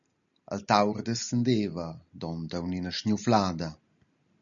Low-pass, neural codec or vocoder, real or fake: 7.2 kHz; none; real